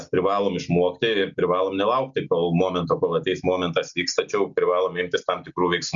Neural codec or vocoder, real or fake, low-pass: none; real; 7.2 kHz